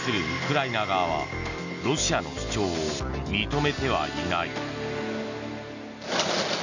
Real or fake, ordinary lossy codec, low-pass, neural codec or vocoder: real; none; 7.2 kHz; none